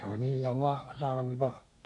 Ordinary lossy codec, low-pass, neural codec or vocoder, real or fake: none; 10.8 kHz; codec, 24 kHz, 1 kbps, SNAC; fake